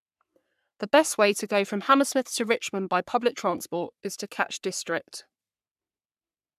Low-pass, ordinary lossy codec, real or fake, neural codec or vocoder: 14.4 kHz; none; fake; codec, 44.1 kHz, 3.4 kbps, Pupu-Codec